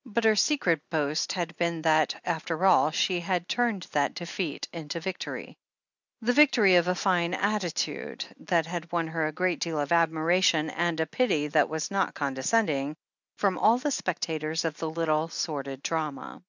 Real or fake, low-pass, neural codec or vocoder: real; 7.2 kHz; none